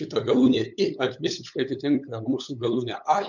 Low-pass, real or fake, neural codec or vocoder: 7.2 kHz; fake; codec, 16 kHz, 8 kbps, FunCodec, trained on LibriTTS, 25 frames a second